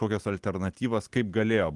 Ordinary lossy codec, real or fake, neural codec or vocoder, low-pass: Opus, 24 kbps; real; none; 10.8 kHz